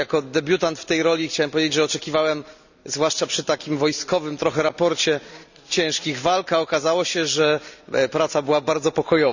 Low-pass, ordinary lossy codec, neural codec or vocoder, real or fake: 7.2 kHz; none; none; real